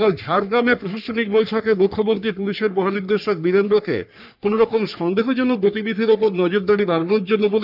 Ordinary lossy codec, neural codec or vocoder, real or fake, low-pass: none; codec, 44.1 kHz, 3.4 kbps, Pupu-Codec; fake; 5.4 kHz